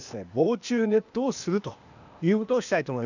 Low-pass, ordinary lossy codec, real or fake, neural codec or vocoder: 7.2 kHz; none; fake; codec, 16 kHz, 0.8 kbps, ZipCodec